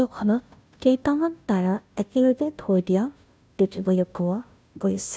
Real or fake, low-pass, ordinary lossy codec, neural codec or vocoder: fake; none; none; codec, 16 kHz, 0.5 kbps, FunCodec, trained on Chinese and English, 25 frames a second